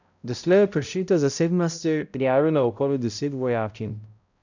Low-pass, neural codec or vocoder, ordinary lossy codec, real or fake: 7.2 kHz; codec, 16 kHz, 0.5 kbps, X-Codec, HuBERT features, trained on balanced general audio; none; fake